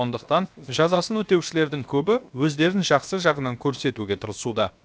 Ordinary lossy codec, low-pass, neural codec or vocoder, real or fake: none; none; codec, 16 kHz, 0.7 kbps, FocalCodec; fake